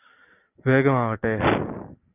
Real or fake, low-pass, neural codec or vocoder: real; 3.6 kHz; none